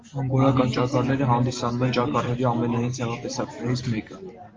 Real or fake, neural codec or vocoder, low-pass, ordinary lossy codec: real; none; 7.2 kHz; Opus, 32 kbps